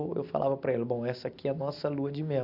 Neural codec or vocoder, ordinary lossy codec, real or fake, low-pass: none; AAC, 48 kbps; real; 5.4 kHz